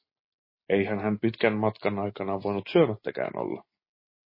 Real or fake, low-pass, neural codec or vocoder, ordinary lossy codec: real; 5.4 kHz; none; MP3, 24 kbps